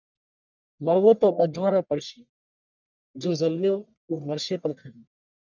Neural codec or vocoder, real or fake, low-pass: codec, 44.1 kHz, 1.7 kbps, Pupu-Codec; fake; 7.2 kHz